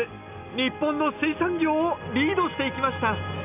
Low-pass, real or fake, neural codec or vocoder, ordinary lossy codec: 3.6 kHz; real; none; none